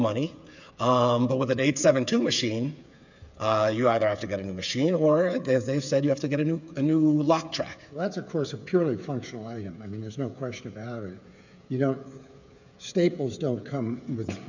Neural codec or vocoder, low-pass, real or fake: codec, 16 kHz, 8 kbps, FreqCodec, smaller model; 7.2 kHz; fake